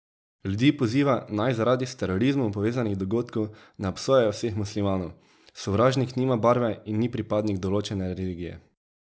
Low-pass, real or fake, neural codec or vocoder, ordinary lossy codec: none; real; none; none